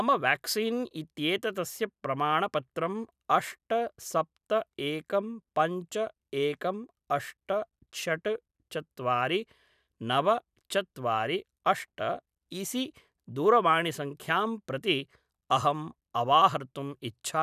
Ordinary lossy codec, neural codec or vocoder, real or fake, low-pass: none; none; real; 14.4 kHz